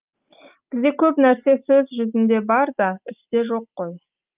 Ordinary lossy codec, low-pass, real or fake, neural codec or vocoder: Opus, 24 kbps; 3.6 kHz; real; none